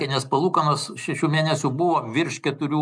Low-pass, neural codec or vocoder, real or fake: 9.9 kHz; none; real